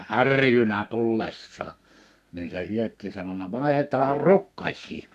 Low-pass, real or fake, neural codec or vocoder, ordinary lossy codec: 14.4 kHz; fake; codec, 32 kHz, 1.9 kbps, SNAC; AAC, 96 kbps